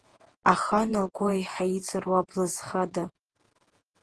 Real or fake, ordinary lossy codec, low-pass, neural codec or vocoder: fake; Opus, 16 kbps; 10.8 kHz; vocoder, 48 kHz, 128 mel bands, Vocos